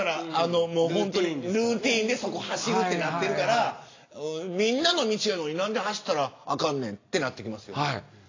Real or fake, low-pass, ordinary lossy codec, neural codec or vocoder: real; 7.2 kHz; AAC, 32 kbps; none